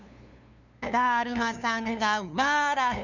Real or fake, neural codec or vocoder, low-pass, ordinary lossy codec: fake; codec, 16 kHz, 2 kbps, FunCodec, trained on LibriTTS, 25 frames a second; 7.2 kHz; none